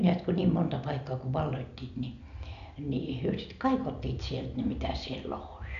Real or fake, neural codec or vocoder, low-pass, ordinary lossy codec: real; none; 7.2 kHz; none